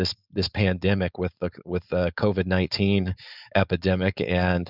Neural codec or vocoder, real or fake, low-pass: codec, 16 kHz, 4.8 kbps, FACodec; fake; 5.4 kHz